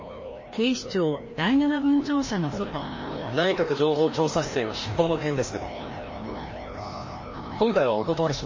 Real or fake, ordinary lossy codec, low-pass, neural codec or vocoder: fake; MP3, 32 kbps; 7.2 kHz; codec, 16 kHz, 1 kbps, FreqCodec, larger model